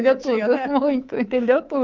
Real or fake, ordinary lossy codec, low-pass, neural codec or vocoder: fake; Opus, 24 kbps; 7.2 kHz; codec, 24 kHz, 3.1 kbps, DualCodec